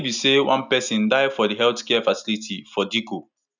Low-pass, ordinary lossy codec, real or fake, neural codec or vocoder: 7.2 kHz; none; real; none